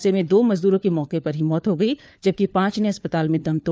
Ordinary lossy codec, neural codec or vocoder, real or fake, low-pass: none; codec, 16 kHz, 4 kbps, FunCodec, trained on LibriTTS, 50 frames a second; fake; none